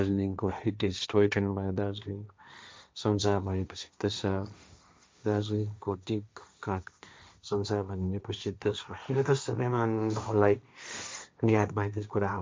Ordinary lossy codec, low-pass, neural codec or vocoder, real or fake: none; none; codec, 16 kHz, 1.1 kbps, Voila-Tokenizer; fake